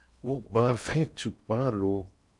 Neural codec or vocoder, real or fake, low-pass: codec, 16 kHz in and 24 kHz out, 0.8 kbps, FocalCodec, streaming, 65536 codes; fake; 10.8 kHz